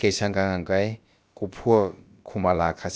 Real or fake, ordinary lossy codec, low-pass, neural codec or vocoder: fake; none; none; codec, 16 kHz, about 1 kbps, DyCAST, with the encoder's durations